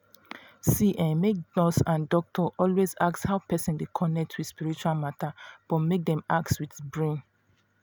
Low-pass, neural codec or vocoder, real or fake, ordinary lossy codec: none; none; real; none